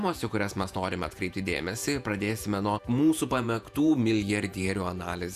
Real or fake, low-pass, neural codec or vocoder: fake; 14.4 kHz; vocoder, 48 kHz, 128 mel bands, Vocos